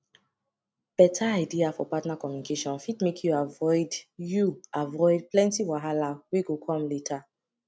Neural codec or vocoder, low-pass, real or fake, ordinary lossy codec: none; none; real; none